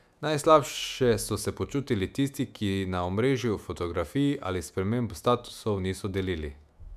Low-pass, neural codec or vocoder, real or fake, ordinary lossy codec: 14.4 kHz; autoencoder, 48 kHz, 128 numbers a frame, DAC-VAE, trained on Japanese speech; fake; none